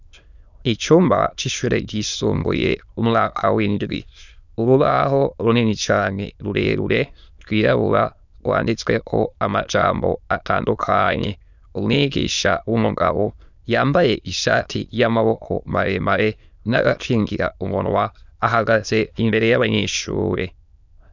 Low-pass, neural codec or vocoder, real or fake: 7.2 kHz; autoencoder, 22.05 kHz, a latent of 192 numbers a frame, VITS, trained on many speakers; fake